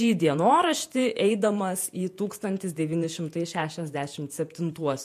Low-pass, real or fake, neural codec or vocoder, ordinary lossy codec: 14.4 kHz; fake; vocoder, 48 kHz, 128 mel bands, Vocos; MP3, 64 kbps